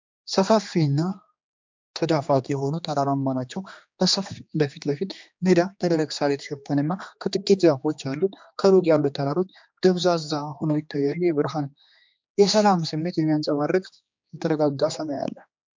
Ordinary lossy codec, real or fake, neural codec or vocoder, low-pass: MP3, 64 kbps; fake; codec, 16 kHz, 2 kbps, X-Codec, HuBERT features, trained on general audio; 7.2 kHz